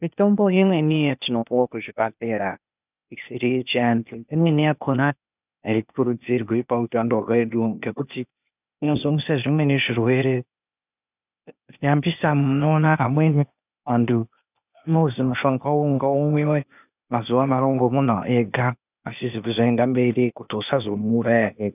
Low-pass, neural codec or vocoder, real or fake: 3.6 kHz; codec, 16 kHz, 0.8 kbps, ZipCodec; fake